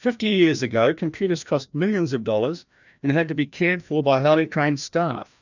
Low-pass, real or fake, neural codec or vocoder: 7.2 kHz; fake; codec, 16 kHz, 1 kbps, FreqCodec, larger model